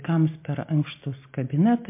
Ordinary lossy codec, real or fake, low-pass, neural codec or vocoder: MP3, 24 kbps; real; 3.6 kHz; none